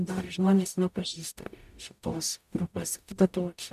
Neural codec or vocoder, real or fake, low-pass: codec, 44.1 kHz, 0.9 kbps, DAC; fake; 14.4 kHz